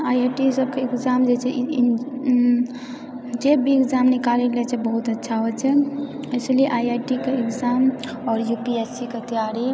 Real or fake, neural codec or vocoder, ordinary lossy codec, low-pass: real; none; none; none